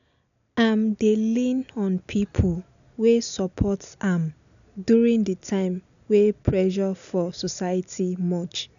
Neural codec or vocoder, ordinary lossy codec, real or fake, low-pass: none; none; real; 7.2 kHz